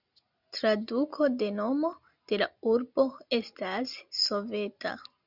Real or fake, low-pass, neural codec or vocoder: real; 5.4 kHz; none